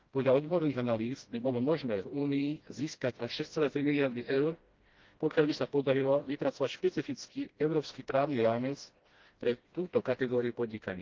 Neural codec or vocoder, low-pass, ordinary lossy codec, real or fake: codec, 16 kHz, 1 kbps, FreqCodec, smaller model; 7.2 kHz; Opus, 32 kbps; fake